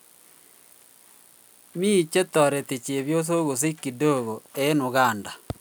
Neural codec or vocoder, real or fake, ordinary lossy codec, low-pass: none; real; none; none